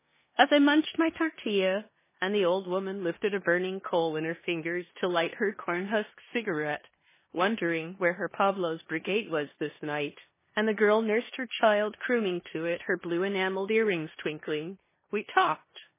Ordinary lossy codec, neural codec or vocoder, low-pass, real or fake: MP3, 16 kbps; codec, 16 kHz in and 24 kHz out, 0.9 kbps, LongCat-Audio-Codec, four codebook decoder; 3.6 kHz; fake